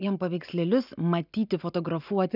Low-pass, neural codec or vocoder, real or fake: 5.4 kHz; none; real